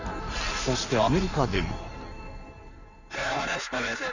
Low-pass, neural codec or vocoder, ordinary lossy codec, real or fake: 7.2 kHz; codec, 16 kHz in and 24 kHz out, 1.1 kbps, FireRedTTS-2 codec; none; fake